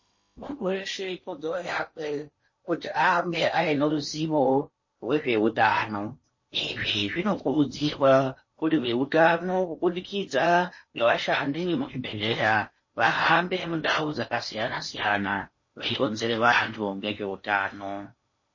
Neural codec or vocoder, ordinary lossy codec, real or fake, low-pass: codec, 16 kHz in and 24 kHz out, 0.8 kbps, FocalCodec, streaming, 65536 codes; MP3, 32 kbps; fake; 7.2 kHz